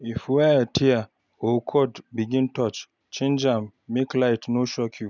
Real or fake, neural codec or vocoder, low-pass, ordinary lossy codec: real; none; 7.2 kHz; none